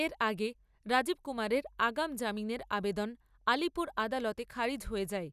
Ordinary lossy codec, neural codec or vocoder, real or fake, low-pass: none; none; real; 14.4 kHz